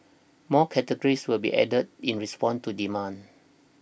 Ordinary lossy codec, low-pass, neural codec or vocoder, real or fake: none; none; none; real